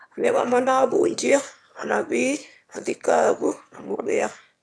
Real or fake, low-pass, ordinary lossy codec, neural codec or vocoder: fake; none; none; autoencoder, 22.05 kHz, a latent of 192 numbers a frame, VITS, trained on one speaker